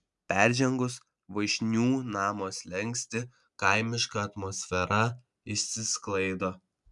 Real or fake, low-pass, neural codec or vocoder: real; 10.8 kHz; none